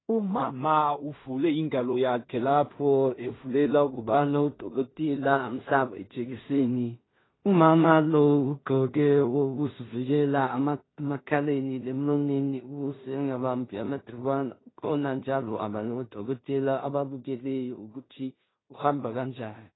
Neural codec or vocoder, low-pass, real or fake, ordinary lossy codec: codec, 16 kHz in and 24 kHz out, 0.4 kbps, LongCat-Audio-Codec, two codebook decoder; 7.2 kHz; fake; AAC, 16 kbps